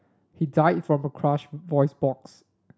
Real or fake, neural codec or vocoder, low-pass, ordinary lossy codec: real; none; none; none